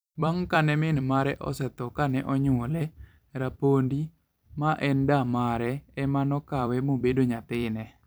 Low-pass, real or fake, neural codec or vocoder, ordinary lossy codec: none; fake; vocoder, 44.1 kHz, 128 mel bands every 256 samples, BigVGAN v2; none